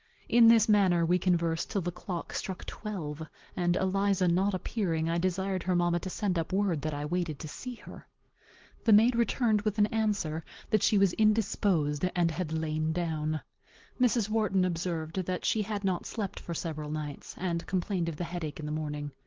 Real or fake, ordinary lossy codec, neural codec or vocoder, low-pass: real; Opus, 16 kbps; none; 7.2 kHz